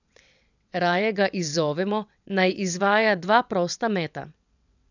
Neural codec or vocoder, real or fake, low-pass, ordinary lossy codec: vocoder, 22.05 kHz, 80 mel bands, WaveNeXt; fake; 7.2 kHz; none